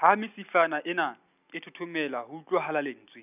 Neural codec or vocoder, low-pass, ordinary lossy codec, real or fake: none; 3.6 kHz; none; real